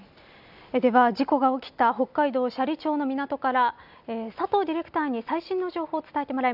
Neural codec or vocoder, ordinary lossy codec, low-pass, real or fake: none; none; 5.4 kHz; real